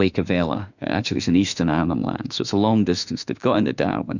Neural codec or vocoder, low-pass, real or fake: autoencoder, 48 kHz, 32 numbers a frame, DAC-VAE, trained on Japanese speech; 7.2 kHz; fake